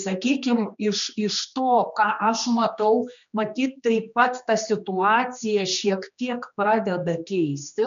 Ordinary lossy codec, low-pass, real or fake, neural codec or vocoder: MP3, 64 kbps; 7.2 kHz; fake; codec, 16 kHz, 2 kbps, X-Codec, HuBERT features, trained on general audio